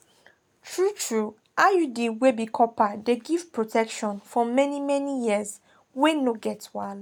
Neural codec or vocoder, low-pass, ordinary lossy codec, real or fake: none; 19.8 kHz; none; real